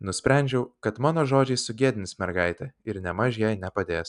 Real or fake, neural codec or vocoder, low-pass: real; none; 10.8 kHz